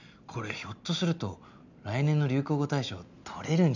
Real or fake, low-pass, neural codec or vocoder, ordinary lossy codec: real; 7.2 kHz; none; none